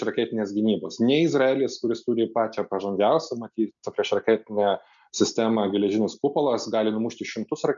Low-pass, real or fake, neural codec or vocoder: 7.2 kHz; real; none